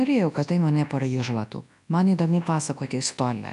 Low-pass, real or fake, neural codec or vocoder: 10.8 kHz; fake; codec, 24 kHz, 0.9 kbps, WavTokenizer, large speech release